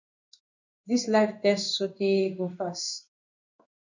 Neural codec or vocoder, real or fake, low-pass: codec, 16 kHz in and 24 kHz out, 1 kbps, XY-Tokenizer; fake; 7.2 kHz